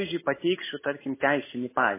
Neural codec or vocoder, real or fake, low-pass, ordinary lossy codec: none; real; 3.6 kHz; MP3, 16 kbps